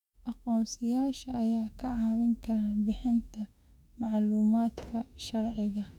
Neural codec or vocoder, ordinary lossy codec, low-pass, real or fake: autoencoder, 48 kHz, 32 numbers a frame, DAC-VAE, trained on Japanese speech; none; 19.8 kHz; fake